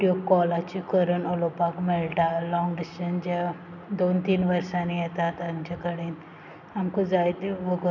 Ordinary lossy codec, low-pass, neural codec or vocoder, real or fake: none; 7.2 kHz; none; real